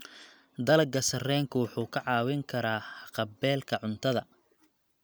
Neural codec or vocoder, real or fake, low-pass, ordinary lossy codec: none; real; none; none